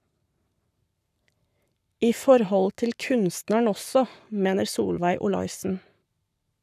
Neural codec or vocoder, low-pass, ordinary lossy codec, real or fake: codec, 44.1 kHz, 7.8 kbps, Pupu-Codec; 14.4 kHz; none; fake